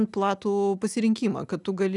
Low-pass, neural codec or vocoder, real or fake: 10.8 kHz; none; real